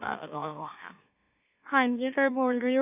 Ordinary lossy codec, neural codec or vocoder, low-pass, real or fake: AAC, 32 kbps; autoencoder, 44.1 kHz, a latent of 192 numbers a frame, MeloTTS; 3.6 kHz; fake